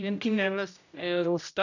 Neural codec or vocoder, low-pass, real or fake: codec, 16 kHz, 0.5 kbps, X-Codec, HuBERT features, trained on general audio; 7.2 kHz; fake